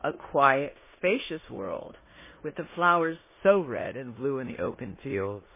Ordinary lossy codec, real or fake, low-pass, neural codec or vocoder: MP3, 16 kbps; fake; 3.6 kHz; codec, 16 kHz in and 24 kHz out, 0.9 kbps, LongCat-Audio-Codec, four codebook decoder